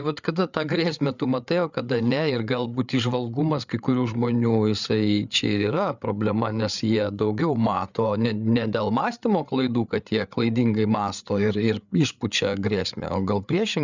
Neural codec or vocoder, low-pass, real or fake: codec, 16 kHz, 8 kbps, FreqCodec, larger model; 7.2 kHz; fake